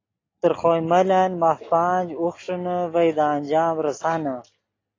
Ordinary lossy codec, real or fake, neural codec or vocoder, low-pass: AAC, 32 kbps; real; none; 7.2 kHz